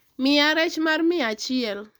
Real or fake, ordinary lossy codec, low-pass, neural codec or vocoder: real; none; none; none